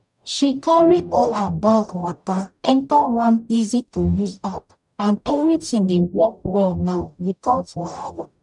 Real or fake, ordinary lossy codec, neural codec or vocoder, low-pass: fake; none; codec, 44.1 kHz, 0.9 kbps, DAC; 10.8 kHz